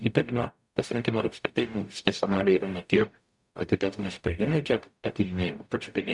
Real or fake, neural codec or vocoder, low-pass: fake; codec, 44.1 kHz, 0.9 kbps, DAC; 10.8 kHz